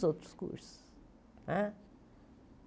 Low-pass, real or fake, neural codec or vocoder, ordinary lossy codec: none; real; none; none